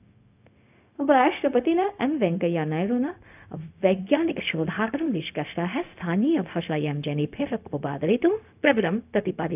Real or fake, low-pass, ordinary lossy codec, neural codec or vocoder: fake; 3.6 kHz; none; codec, 16 kHz, 0.4 kbps, LongCat-Audio-Codec